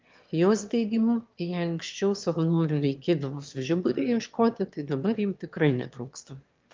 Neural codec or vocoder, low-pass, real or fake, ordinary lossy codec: autoencoder, 22.05 kHz, a latent of 192 numbers a frame, VITS, trained on one speaker; 7.2 kHz; fake; Opus, 32 kbps